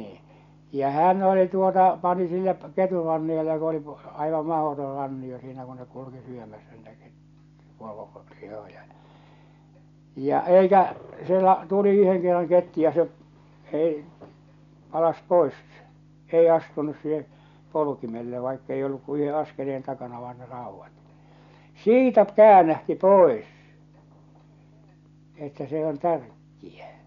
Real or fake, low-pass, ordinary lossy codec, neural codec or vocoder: real; 7.2 kHz; MP3, 96 kbps; none